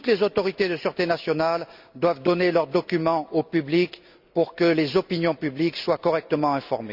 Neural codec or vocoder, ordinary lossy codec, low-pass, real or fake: none; Opus, 64 kbps; 5.4 kHz; real